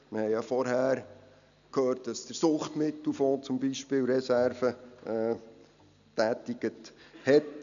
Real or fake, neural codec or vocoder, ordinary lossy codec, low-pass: real; none; none; 7.2 kHz